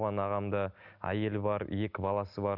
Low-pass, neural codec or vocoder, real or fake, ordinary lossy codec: 5.4 kHz; none; real; none